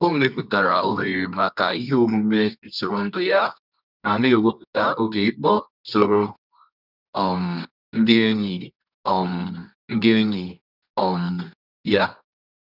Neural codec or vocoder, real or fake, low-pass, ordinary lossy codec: codec, 24 kHz, 0.9 kbps, WavTokenizer, medium music audio release; fake; 5.4 kHz; none